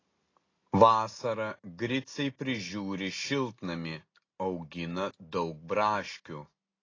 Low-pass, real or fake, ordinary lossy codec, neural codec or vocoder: 7.2 kHz; real; AAC, 32 kbps; none